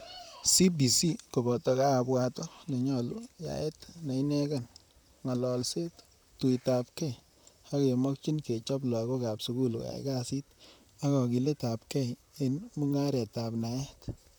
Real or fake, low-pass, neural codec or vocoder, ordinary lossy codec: fake; none; vocoder, 44.1 kHz, 128 mel bands, Pupu-Vocoder; none